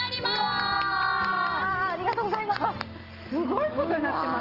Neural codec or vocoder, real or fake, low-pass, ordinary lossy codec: none; real; 5.4 kHz; Opus, 16 kbps